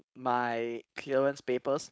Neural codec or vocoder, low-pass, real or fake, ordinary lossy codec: codec, 16 kHz, 4.8 kbps, FACodec; none; fake; none